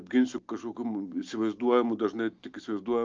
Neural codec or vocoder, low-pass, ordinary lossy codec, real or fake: none; 7.2 kHz; Opus, 24 kbps; real